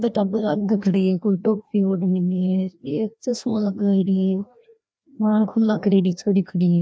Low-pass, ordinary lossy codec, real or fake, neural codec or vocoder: none; none; fake; codec, 16 kHz, 1 kbps, FreqCodec, larger model